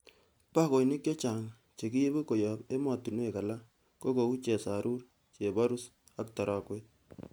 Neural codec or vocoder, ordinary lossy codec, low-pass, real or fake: none; none; none; real